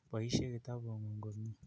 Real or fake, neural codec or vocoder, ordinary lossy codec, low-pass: real; none; none; none